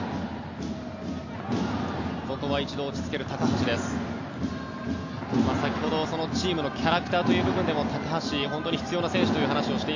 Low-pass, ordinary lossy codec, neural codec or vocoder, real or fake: 7.2 kHz; AAC, 48 kbps; none; real